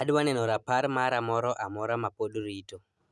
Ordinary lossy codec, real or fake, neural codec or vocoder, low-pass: none; real; none; none